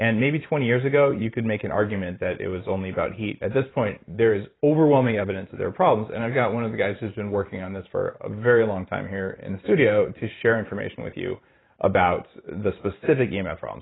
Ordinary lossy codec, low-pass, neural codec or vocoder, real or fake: AAC, 16 kbps; 7.2 kHz; vocoder, 44.1 kHz, 128 mel bands every 512 samples, BigVGAN v2; fake